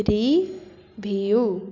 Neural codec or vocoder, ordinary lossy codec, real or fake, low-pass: none; none; real; 7.2 kHz